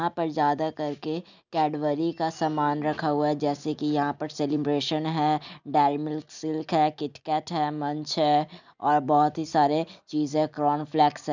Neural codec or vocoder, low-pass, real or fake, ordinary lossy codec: none; 7.2 kHz; real; none